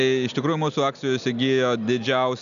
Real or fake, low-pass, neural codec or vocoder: real; 7.2 kHz; none